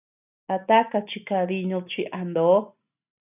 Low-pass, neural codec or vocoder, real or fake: 3.6 kHz; none; real